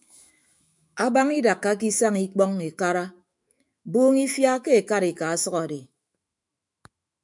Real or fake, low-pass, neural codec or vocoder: fake; 10.8 kHz; autoencoder, 48 kHz, 128 numbers a frame, DAC-VAE, trained on Japanese speech